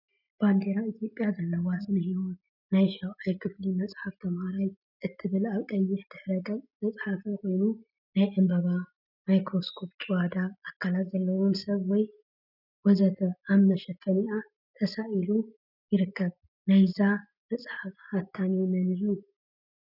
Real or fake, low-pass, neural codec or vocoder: real; 5.4 kHz; none